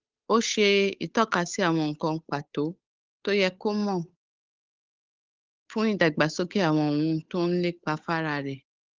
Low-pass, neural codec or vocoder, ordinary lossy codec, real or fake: 7.2 kHz; codec, 16 kHz, 8 kbps, FunCodec, trained on Chinese and English, 25 frames a second; Opus, 24 kbps; fake